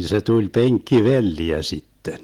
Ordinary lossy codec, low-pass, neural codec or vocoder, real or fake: Opus, 24 kbps; 19.8 kHz; none; real